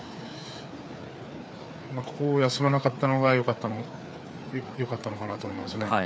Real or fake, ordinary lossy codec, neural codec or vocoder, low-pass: fake; none; codec, 16 kHz, 4 kbps, FreqCodec, larger model; none